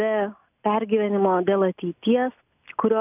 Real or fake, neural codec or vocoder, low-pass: real; none; 3.6 kHz